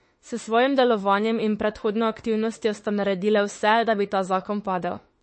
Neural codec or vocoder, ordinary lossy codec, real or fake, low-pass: autoencoder, 48 kHz, 32 numbers a frame, DAC-VAE, trained on Japanese speech; MP3, 32 kbps; fake; 9.9 kHz